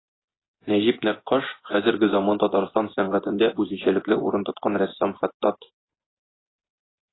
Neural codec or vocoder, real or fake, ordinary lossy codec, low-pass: vocoder, 24 kHz, 100 mel bands, Vocos; fake; AAC, 16 kbps; 7.2 kHz